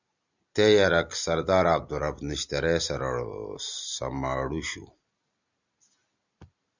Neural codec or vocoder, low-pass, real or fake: none; 7.2 kHz; real